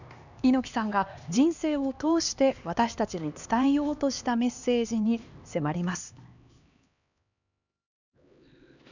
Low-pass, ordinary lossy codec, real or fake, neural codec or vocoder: 7.2 kHz; none; fake; codec, 16 kHz, 2 kbps, X-Codec, HuBERT features, trained on LibriSpeech